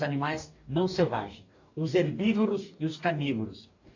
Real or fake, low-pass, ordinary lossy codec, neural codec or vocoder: fake; 7.2 kHz; AAC, 48 kbps; codec, 44.1 kHz, 2.6 kbps, DAC